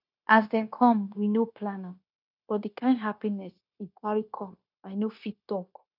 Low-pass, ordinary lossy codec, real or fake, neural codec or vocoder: 5.4 kHz; none; fake; codec, 16 kHz, 0.9 kbps, LongCat-Audio-Codec